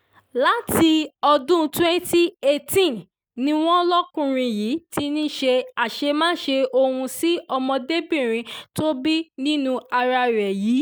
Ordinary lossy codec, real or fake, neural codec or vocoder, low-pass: none; real; none; none